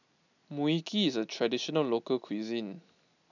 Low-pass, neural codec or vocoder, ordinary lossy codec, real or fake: 7.2 kHz; none; none; real